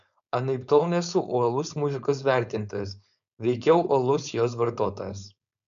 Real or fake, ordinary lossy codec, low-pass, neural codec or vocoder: fake; MP3, 96 kbps; 7.2 kHz; codec, 16 kHz, 4.8 kbps, FACodec